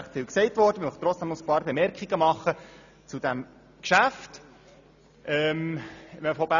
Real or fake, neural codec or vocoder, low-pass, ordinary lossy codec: real; none; 7.2 kHz; none